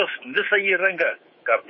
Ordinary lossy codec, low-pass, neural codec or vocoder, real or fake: MP3, 24 kbps; 7.2 kHz; codec, 24 kHz, 3.1 kbps, DualCodec; fake